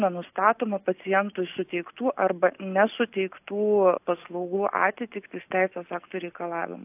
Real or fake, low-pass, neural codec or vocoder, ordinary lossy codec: real; 3.6 kHz; none; AAC, 32 kbps